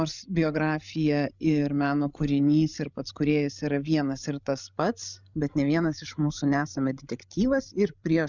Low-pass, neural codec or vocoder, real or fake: 7.2 kHz; codec, 16 kHz, 16 kbps, FreqCodec, larger model; fake